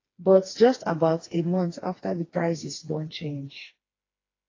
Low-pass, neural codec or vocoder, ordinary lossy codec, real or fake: 7.2 kHz; codec, 16 kHz, 2 kbps, FreqCodec, smaller model; AAC, 32 kbps; fake